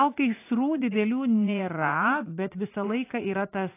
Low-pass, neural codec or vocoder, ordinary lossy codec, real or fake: 3.6 kHz; vocoder, 44.1 kHz, 80 mel bands, Vocos; AAC, 24 kbps; fake